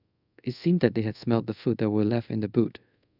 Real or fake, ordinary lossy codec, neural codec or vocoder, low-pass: fake; none; codec, 24 kHz, 0.5 kbps, DualCodec; 5.4 kHz